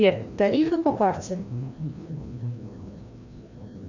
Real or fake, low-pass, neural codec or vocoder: fake; 7.2 kHz; codec, 16 kHz, 1 kbps, FreqCodec, larger model